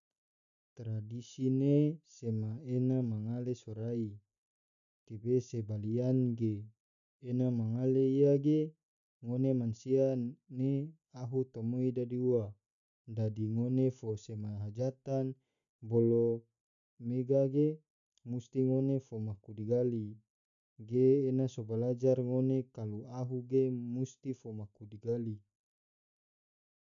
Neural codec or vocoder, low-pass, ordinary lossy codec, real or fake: none; 7.2 kHz; none; real